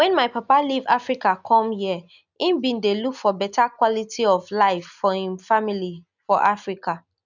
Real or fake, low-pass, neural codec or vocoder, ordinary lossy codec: real; 7.2 kHz; none; none